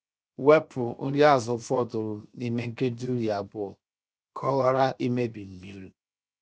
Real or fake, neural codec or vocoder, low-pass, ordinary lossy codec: fake; codec, 16 kHz, 0.7 kbps, FocalCodec; none; none